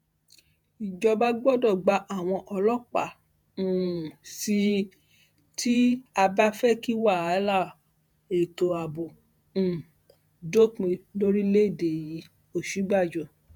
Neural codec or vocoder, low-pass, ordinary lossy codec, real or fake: vocoder, 48 kHz, 128 mel bands, Vocos; 19.8 kHz; none; fake